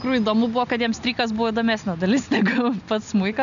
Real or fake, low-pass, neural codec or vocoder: real; 7.2 kHz; none